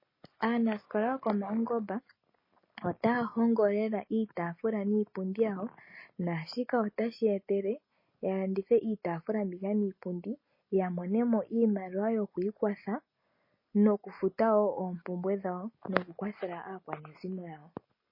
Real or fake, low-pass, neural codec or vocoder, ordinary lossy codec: real; 5.4 kHz; none; MP3, 24 kbps